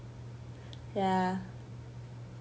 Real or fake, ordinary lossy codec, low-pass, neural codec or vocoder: real; none; none; none